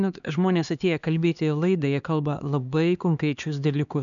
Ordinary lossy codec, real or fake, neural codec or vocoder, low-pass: MP3, 96 kbps; fake; codec, 16 kHz, 2 kbps, X-Codec, HuBERT features, trained on LibriSpeech; 7.2 kHz